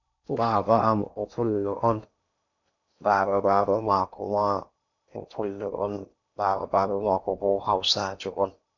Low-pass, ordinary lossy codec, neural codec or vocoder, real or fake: 7.2 kHz; none; codec, 16 kHz in and 24 kHz out, 0.8 kbps, FocalCodec, streaming, 65536 codes; fake